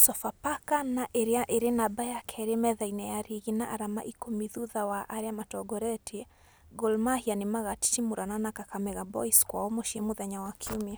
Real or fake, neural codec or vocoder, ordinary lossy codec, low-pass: real; none; none; none